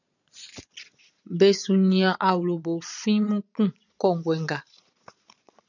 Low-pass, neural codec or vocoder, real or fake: 7.2 kHz; vocoder, 22.05 kHz, 80 mel bands, Vocos; fake